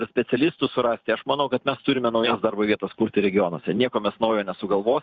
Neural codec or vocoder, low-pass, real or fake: vocoder, 44.1 kHz, 128 mel bands every 512 samples, BigVGAN v2; 7.2 kHz; fake